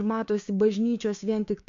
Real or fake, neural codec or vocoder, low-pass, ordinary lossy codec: fake; codec, 16 kHz, 6 kbps, DAC; 7.2 kHz; MP3, 64 kbps